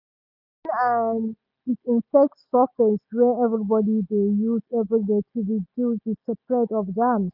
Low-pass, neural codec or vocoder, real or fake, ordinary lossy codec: 5.4 kHz; none; real; none